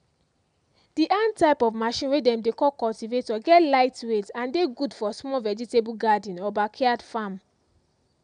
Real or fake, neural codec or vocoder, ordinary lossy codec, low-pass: real; none; none; 9.9 kHz